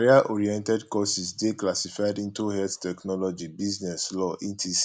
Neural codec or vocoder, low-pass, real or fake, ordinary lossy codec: none; none; real; none